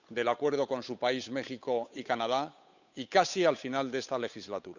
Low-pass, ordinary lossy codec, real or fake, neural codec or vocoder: 7.2 kHz; none; fake; codec, 16 kHz, 8 kbps, FunCodec, trained on Chinese and English, 25 frames a second